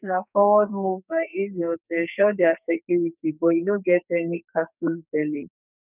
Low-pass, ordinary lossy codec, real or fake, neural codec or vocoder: 3.6 kHz; none; fake; codec, 44.1 kHz, 2.6 kbps, SNAC